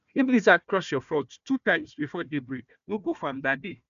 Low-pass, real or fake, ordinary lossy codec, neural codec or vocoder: 7.2 kHz; fake; none; codec, 16 kHz, 1 kbps, FunCodec, trained on Chinese and English, 50 frames a second